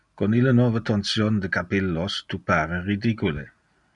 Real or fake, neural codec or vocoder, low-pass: real; none; 10.8 kHz